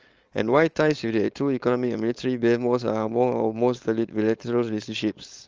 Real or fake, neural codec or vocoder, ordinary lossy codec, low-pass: fake; codec, 16 kHz, 4.8 kbps, FACodec; Opus, 24 kbps; 7.2 kHz